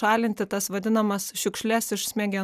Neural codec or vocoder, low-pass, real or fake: none; 14.4 kHz; real